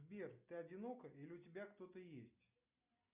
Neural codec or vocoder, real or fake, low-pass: none; real; 3.6 kHz